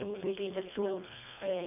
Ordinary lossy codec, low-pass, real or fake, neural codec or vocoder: none; 3.6 kHz; fake; codec, 24 kHz, 1.5 kbps, HILCodec